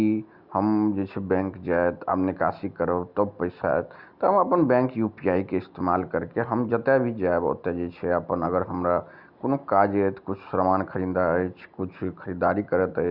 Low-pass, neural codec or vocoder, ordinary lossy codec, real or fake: 5.4 kHz; none; Opus, 64 kbps; real